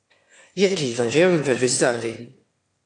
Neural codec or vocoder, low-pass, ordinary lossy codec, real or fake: autoencoder, 22.05 kHz, a latent of 192 numbers a frame, VITS, trained on one speaker; 9.9 kHz; AAC, 48 kbps; fake